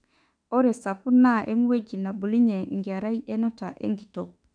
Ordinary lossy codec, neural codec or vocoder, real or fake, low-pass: none; autoencoder, 48 kHz, 32 numbers a frame, DAC-VAE, trained on Japanese speech; fake; 9.9 kHz